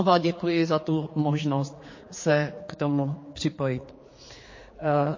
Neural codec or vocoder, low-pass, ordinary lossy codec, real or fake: codec, 16 kHz, 4 kbps, X-Codec, HuBERT features, trained on general audio; 7.2 kHz; MP3, 32 kbps; fake